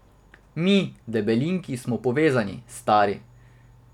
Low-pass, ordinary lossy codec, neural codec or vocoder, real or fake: 19.8 kHz; none; none; real